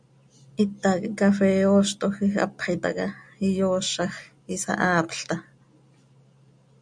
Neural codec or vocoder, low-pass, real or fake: none; 9.9 kHz; real